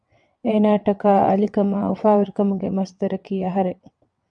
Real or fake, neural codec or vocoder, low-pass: fake; vocoder, 22.05 kHz, 80 mel bands, WaveNeXt; 9.9 kHz